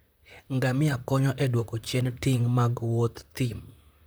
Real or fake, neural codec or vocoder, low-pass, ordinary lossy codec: fake; vocoder, 44.1 kHz, 128 mel bands, Pupu-Vocoder; none; none